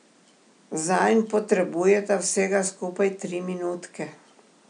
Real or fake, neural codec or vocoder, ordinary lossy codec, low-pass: real; none; none; 9.9 kHz